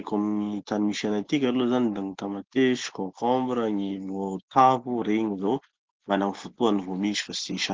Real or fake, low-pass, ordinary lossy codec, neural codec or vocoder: real; 7.2 kHz; Opus, 16 kbps; none